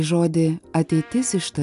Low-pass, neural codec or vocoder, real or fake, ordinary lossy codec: 10.8 kHz; none; real; AAC, 96 kbps